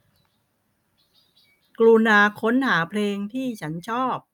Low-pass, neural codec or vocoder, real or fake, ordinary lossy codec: 19.8 kHz; none; real; none